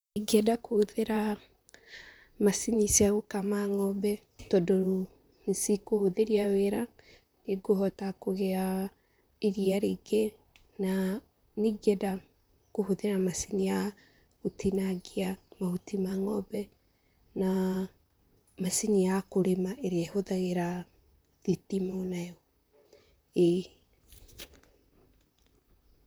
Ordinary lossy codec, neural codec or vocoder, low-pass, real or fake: none; vocoder, 44.1 kHz, 128 mel bands, Pupu-Vocoder; none; fake